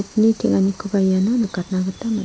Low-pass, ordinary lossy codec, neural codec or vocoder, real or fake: none; none; none; real